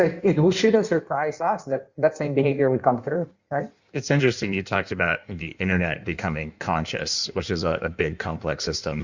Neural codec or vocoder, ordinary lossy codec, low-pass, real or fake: codec, 16 kHz in and 24 kHz out, 1.1 kbps, FireRedTTS-2 codec; Opus, 64 kbps; 7.2 kHz; fake